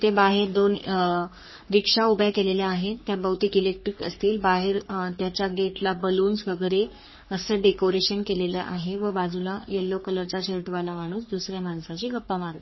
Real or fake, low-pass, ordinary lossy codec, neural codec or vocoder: fake; 7.2 kHz; MP3, 24 kbps; codec, 44.1 kHz, 3.4 kbps, Pupu-Codec